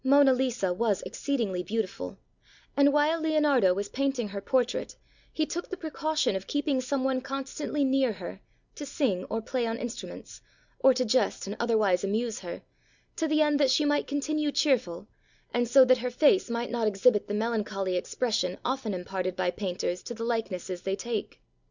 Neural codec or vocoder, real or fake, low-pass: none; real; 7.2 kHz